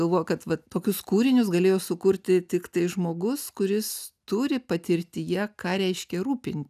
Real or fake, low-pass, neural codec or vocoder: real; 14.4 kHz; none